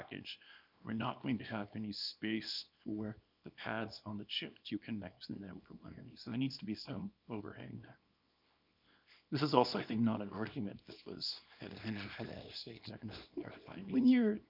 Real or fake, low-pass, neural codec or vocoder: fake; 5.4 kHz; codec, 24 kHz, 0.9 kbps, WavTokenizer, small release